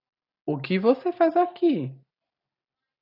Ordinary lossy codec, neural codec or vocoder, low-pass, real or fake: AAC, 32 kbps; none; 5.4 kHz; real